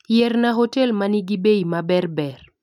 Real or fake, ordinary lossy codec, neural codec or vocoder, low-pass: real; none; none; 19.8 kHz